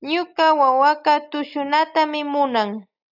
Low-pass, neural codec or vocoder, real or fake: 5.4 kHz; none; real